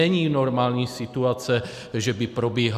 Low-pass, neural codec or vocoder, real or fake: 14.4 kHz; none; real